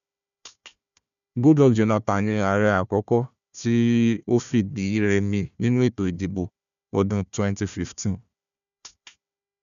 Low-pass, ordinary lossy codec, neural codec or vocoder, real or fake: 7.2 kHz; none; codec, 16 kHz, 1 kbps, FunCodec, trained on Chinese and English, 50 frames a second; fake